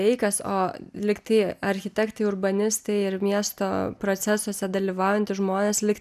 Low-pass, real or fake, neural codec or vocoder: 14.4 kHz; real; none